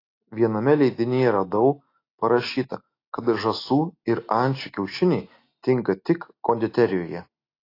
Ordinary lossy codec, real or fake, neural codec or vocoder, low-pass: AAC, 32 kbps; real; none; 5.4 kHz